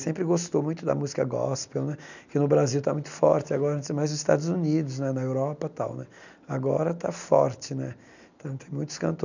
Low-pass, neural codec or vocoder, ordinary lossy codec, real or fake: 7.2 kHz; none; none; real